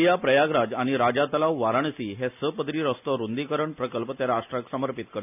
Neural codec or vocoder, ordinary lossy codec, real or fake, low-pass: none; none; real; 3.6 kHz